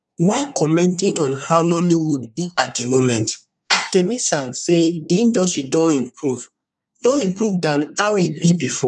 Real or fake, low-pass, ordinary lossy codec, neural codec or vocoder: fake; 10.8 kHz; none; codec, 24 kHz, 1 kbps, SNAC